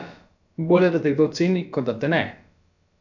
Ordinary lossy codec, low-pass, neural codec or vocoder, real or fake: none; 7.2 kHz; codec, 16 kHz, about 1 kbps, DyCAST, with the encoder's durations; fake